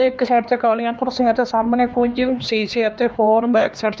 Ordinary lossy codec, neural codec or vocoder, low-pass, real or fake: none; codec, 16 kHz, 2 kbps, X-Codec, HuBERT features, trained on LibriSpeech; none; fake